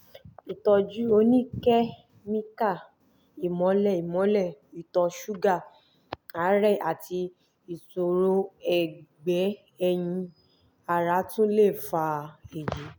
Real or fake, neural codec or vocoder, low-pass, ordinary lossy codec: real; none; none; none